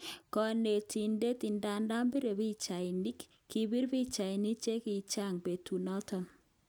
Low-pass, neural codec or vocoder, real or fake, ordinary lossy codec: none; none; real; none